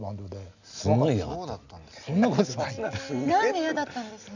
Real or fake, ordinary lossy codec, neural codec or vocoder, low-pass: real; none; none; 7.2 kHz